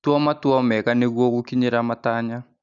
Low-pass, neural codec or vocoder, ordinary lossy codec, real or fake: 7.2 kHz; none; none; real